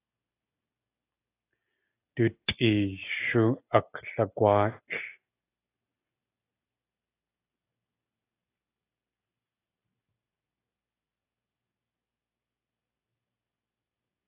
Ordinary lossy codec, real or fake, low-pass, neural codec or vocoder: AAC, 24 kbps; real; 3.6 kHz; none